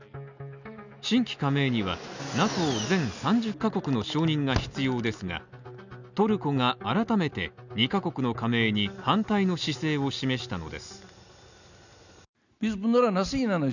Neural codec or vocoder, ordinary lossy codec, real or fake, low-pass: none; none; real; 7.2 kHz